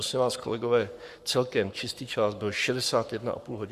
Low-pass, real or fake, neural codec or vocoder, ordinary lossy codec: 14.4 kHz; fake; codec, 44.1 kHz, 7.8 kbps, Pupu-Codec; Opus, 64 kbps